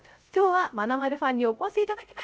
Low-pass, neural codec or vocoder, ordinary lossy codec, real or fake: none; codec, 16 kHz, 0.3 kbps, FocalCodec; none; fake